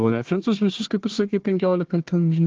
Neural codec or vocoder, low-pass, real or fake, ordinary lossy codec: codec, 16 kHz, 1 kbps, FunCodec, trained on Chinese and English, 50 frames a second; 7.2 kHz; fake; Opus, 16 kbps